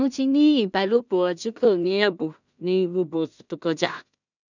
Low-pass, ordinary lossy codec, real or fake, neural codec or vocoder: 7.2 kHz; none; fake; codec, 16 kHz in and 24 kHz out, 0.4 kbps, LongCat-Audio-Codec, two codebook decoder